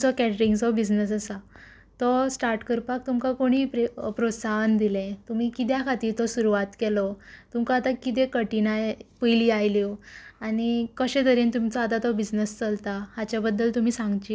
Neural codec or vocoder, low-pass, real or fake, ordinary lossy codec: none; none; real; none